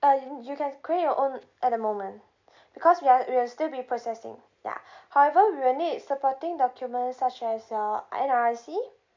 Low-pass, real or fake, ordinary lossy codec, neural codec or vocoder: 7.2 kHz; real; MP3, 64 kbps; none